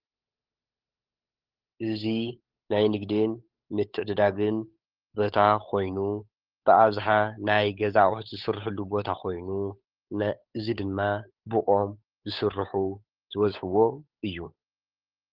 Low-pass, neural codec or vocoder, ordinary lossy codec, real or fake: 5.4 kHz; codec, 16 kHz, 8 kbps, FunCodec, trained on Chinese and English, 25 frames a second; Opus, 24 kbps; fake